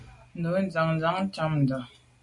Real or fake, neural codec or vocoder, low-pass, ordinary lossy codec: real; none; 10.8 kHz; MP3, 48 kbps